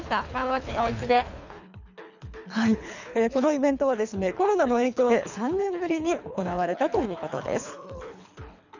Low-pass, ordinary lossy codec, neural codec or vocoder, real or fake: 7.2 kHz; none; codec, 24 kHz, 3 kbps, HILCodec; fake